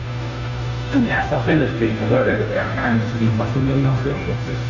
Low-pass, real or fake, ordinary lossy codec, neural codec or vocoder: 7.2 kHz; fake; none; codec, 16 kHz, 0.5 kbps, FunCodec, trained on Chinese and English, 25 frames a second